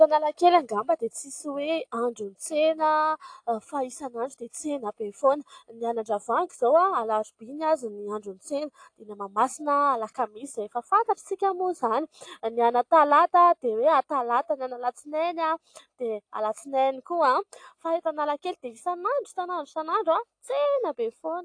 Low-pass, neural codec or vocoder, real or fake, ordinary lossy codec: 9.9 kHz; none; real; AAC, 48 kbps